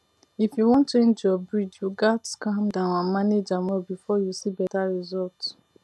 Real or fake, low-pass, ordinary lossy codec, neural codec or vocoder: real; none; none; none